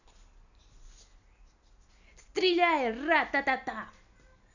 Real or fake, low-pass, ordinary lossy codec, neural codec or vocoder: real; 7.2 kHz; none; none